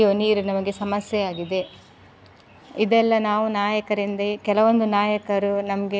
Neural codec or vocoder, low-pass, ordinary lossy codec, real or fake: none; none; none; real